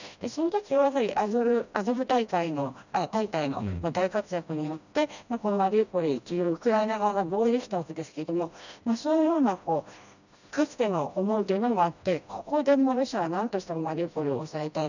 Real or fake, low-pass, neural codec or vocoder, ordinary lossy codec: fake; 7.2 kHz; codec, 16 kHz, 1 kbps, FreqCodec, smaller model; none